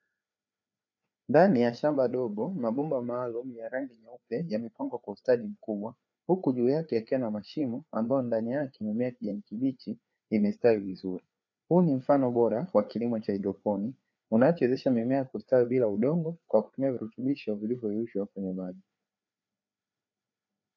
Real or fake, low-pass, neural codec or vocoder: fake; 7.2 kHz; codec, 16 kHz, 4 kbps, FreqCodec, larger model